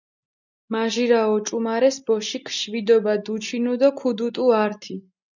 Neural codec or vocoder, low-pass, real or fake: none; 7.2 kHz; real